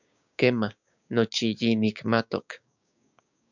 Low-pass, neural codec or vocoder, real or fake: 7.2 kHz; codec, 16 kHz, 6 kbps, DAC; fake